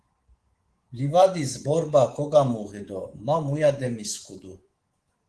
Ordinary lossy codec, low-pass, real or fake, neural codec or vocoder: Opus, 16 kbps; 10.8 kHz; fake; codec, 24 kHz, 3.1 kbps, DualCodec